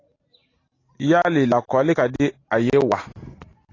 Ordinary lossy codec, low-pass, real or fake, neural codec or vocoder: AAC, 32 kbps; 7.2 kHz; real; none